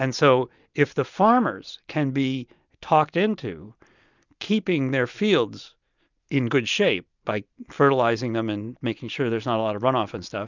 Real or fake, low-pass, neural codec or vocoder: real; 7.2 kHz; none